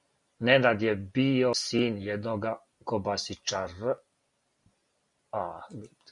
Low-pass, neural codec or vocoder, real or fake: 10.8 kHz; none; real